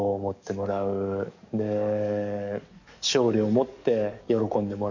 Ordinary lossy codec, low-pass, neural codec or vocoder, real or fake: none; 7.2 kHz; codec, 44.1 kHz, 7.8 kbps, DAC; fake